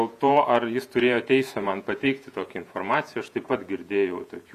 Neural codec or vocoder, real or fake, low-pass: vocoder, 48 kHz, 128 mel bands, Vocos; fake; 14.4 kHz